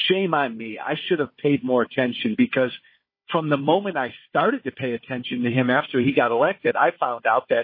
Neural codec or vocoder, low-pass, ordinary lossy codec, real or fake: codec, 16 kHz, 4 kbps, FunCodec, trained on Chinese and English, 50 frames a second; 5.4 kHz; MP3, 24 kbps; fake